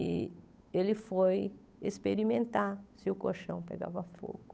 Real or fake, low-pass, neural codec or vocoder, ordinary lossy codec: fake; none; codec, 16 kHz, 8 kbps, FunCodec, trained on Chinese and English, 25 frames a second; none